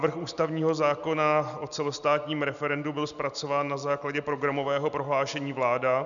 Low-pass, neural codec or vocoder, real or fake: 7.2 kHz; none; real